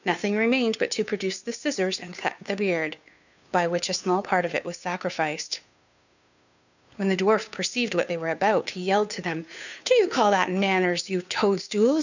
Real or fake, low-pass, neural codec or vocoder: fake; 7.2 kHz; codec, 16 kHz, 2 kbps, FunCodec, trained on Chinese and English, 25 frames a second